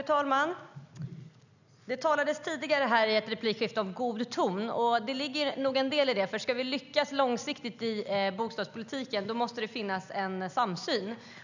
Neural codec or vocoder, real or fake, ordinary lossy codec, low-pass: none; real; none; 7.2 kHz